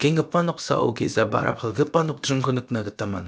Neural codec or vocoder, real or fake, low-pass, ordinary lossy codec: codec, 16 kHz, about 1 kbps, DyCAST, with the encoder's durations; fake; none; none